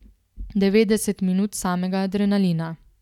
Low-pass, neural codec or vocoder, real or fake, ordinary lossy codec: 19.8 kHz; none; real; none